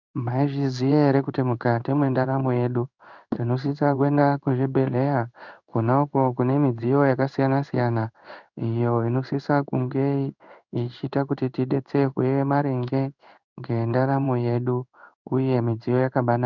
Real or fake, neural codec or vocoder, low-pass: fake; codec, 16 kHz in and 24 kHz out, 1 kbps, XY-Tokenizer; 7.2 kHz